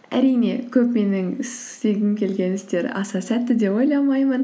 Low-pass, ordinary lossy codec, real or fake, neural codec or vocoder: none; none; real; none